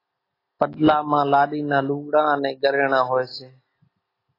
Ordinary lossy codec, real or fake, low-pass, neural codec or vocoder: AAC, 24 kbps; real; 5.4 kHz; none